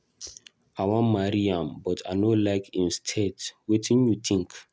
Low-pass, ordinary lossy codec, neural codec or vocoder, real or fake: none; none; none; real